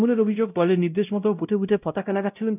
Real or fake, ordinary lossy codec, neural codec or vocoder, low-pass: fake; none; codec, 16 kHz, 0.5 kbps, X-Codec, WavLM features, trained on Multilingual LibriSpeech; 3.6 kHz